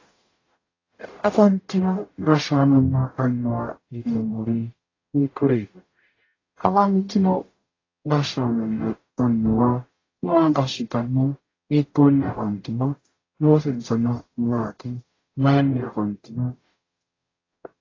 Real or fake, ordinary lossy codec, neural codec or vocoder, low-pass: fake; AAC, 32 kbps; codec, 44.1 kHz, 0.9 kbps, DAC; 7.2 kHz